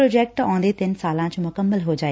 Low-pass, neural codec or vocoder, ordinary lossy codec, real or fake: none; none; none; real